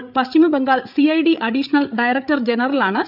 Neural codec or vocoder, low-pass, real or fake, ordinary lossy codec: codec, 16 kHz, 8 kbps, FreqCodec, larger model; 5.4 kHz; fake; none